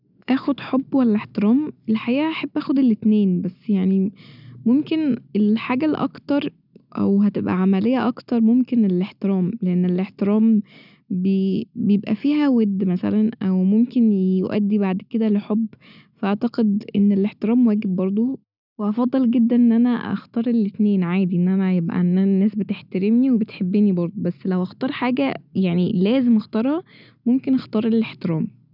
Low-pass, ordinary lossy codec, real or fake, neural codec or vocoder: 5.4 kHz; none; real; none